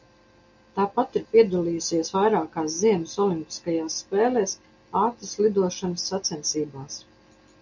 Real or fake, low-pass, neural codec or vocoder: real; 7.2 kHz; none